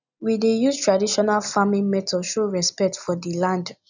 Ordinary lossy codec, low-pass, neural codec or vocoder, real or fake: none; 7.2 kHz; none; real